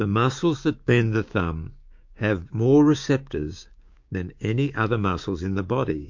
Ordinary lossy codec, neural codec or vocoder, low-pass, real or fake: MP3, 48 kbps; codec, 16 kHz, 6 kbps, DAC; 7.2 kHz; fake